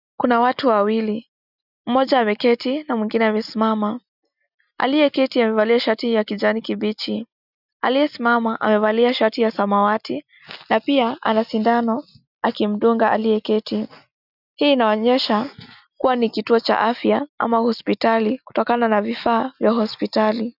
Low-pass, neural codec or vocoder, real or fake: 5.4 kHz; none; real